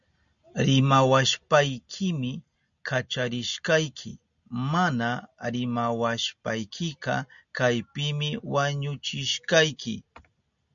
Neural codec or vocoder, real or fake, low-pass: none; real; 7.2 kHz